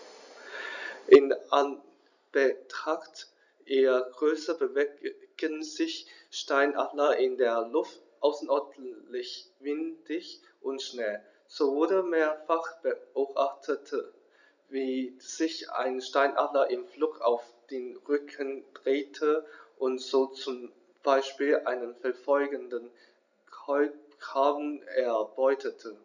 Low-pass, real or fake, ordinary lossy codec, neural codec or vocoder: 7.2 kHz; real; none; none